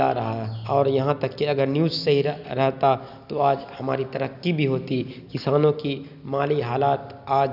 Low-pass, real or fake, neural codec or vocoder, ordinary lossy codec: 5.4 kHz; real; none; none